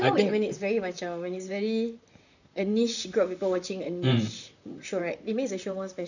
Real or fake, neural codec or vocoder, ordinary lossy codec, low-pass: fake; vocoder, 44.1 kHz, 128 mel bands, Pupu-Vocoder; none; 7.2 kHz